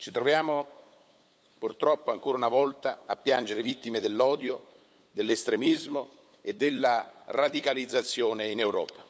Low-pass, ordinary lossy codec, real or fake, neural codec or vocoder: none; none; fake; codec, 16 kHz, 8 kbps, FunCodec, trained on LibriTTS, 25 frames a second